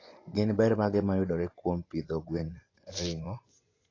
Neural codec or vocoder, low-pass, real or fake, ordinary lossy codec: vocoder, 24 kHz, 100 mel bands, Vocos; 7.2 kHz; fake; AAC, 32 kbps